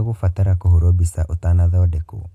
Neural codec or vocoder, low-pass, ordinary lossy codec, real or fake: none; 14.4 kHz; AAC, 64 kbps; real